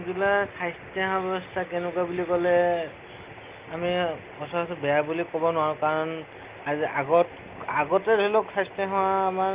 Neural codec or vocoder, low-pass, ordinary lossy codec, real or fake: none; 3.6 kHz; Opus, 24 kbps; real